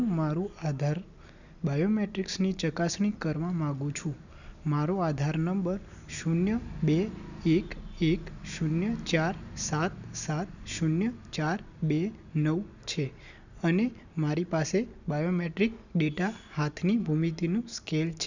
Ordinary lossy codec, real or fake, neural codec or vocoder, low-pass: none; real; none; 7.2 kHz